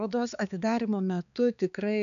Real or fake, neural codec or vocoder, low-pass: fake; codec, 16 kHz, 4 kbps, X-Codec, HuBERT features, trained on balanced general audio; 7.2 kHz